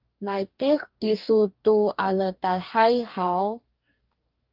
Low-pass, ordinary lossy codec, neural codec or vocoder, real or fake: 5.4 kHz; Opus, 24 kbps; codec, 44.1 kHz, 2.6 kbps, DAC; fake